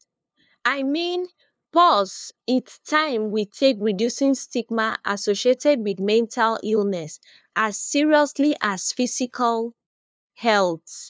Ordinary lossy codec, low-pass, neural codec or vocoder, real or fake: none; none; codec, 16 kHz, 2 kbps, FunCodec, trained on LibriTTS, 25 frames a second; fake